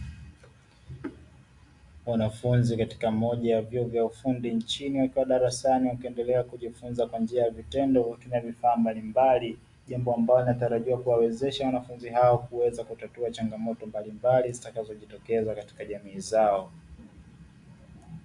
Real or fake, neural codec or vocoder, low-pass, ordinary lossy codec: real; none; 10.8 kHz; AAC, 64 kbps